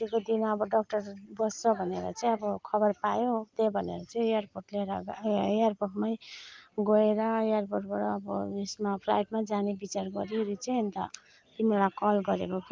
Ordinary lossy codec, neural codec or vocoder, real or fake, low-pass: Opus, 32 kbps; none; real; 7.2 kHz